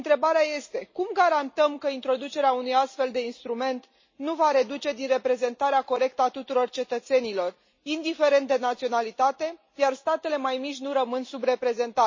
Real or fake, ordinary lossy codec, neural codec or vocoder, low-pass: real; MP3, 32 kbps; none; 7.2 kHz